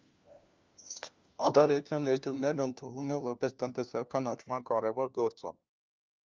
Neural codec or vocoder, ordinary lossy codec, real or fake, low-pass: codec, 16 kHz, 1 kbps, FunCodec, trained on LibriTTS, 50 frames a second; Opus, 24 kbps; fake; 7.2 kHz